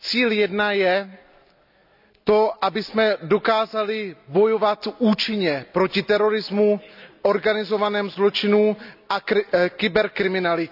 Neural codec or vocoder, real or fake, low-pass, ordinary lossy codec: none; real; 5.4 kHz; none